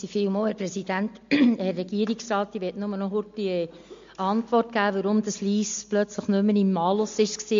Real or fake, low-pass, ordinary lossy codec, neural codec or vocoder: real; 7.2 kHz; MP3, 48 kbps; none